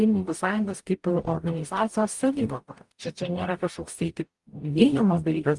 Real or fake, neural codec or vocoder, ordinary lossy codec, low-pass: fake; codec, 44.1 kHz, 0.9 kbps, DAC; Opus, 32 kbps; 10.8 kHz